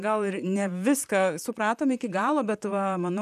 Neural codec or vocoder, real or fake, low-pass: vocoder, 44.1 kHz, 128 mel bands, Pupu-Vocoder; fake; 14.4 kHz